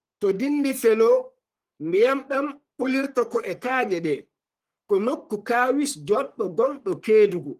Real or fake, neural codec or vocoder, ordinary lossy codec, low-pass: fake; codec, 44.1 kHz, 3.4 kbps, Pupu-Codec; Opus, 24 kbps; 14.4 kHz